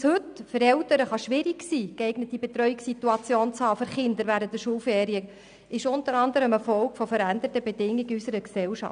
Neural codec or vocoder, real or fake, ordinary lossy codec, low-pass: none; real; none; 9.9 kHz